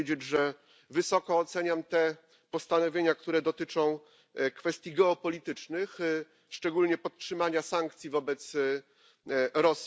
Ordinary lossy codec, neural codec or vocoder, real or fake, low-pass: none; none; real; none